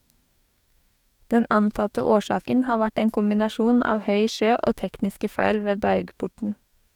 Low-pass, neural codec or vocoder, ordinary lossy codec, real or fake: 19.8 kHz; codec, 44.1 kHz, 2.6 kbps, DAC; none; fake